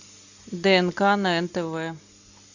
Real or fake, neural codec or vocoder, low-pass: real; none; 7.2 kHz